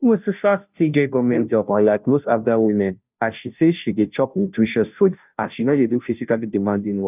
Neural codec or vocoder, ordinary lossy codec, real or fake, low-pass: codec, 16 kHz, 0.5 kbps, FunCodec, trained on Chinese and English, 25 frames a second; none; fake; 3.6 kHz